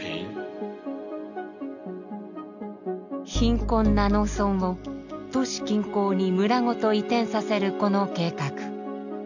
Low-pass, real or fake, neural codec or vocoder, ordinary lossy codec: 7.2 kHz; real; none; none